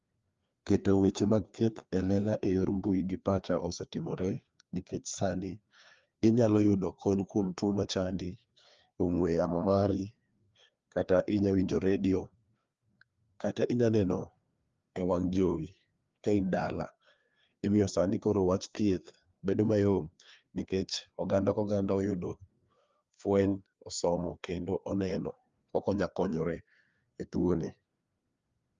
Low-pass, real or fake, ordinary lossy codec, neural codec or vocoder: 7.2 kHz; fake; Opus, 32 kbps; codec, 16 kHz, 2 kbps, FreqCodec, larger model